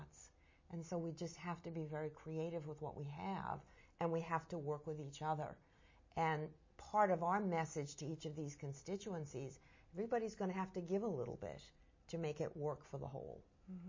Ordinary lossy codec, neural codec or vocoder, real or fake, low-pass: MP3, 32 kbps; none; real; 7.2 kHz